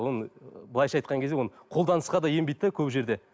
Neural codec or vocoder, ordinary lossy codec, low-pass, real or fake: none; none; none; real